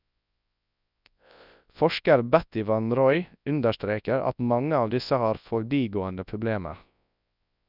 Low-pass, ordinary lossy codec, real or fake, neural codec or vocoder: 5.4 kHz; none; fake; codec, 24 kHz, 0.9 kbps, WavTokenizer, large speech release